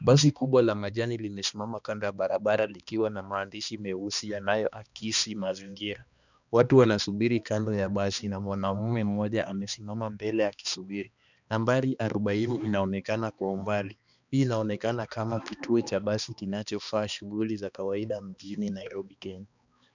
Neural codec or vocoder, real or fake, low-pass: codec, 16 kHz, 2 kbps, X-Codec, HuBERT features, trained on balanced general audio; fake; 7.2 kHz